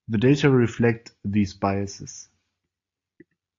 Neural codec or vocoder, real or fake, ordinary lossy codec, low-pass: none; real; AAC, 48 kbps; 7.2 kHz